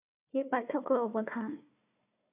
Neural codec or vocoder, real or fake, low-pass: codec, 16 kHz, 1 kbps, FunCodec, trained on Chinese and English, 50 frames a second; fake; 3.6 kHz